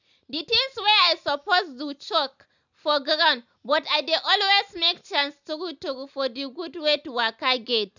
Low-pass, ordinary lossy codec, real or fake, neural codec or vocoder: 7.2 kHz; none; real; none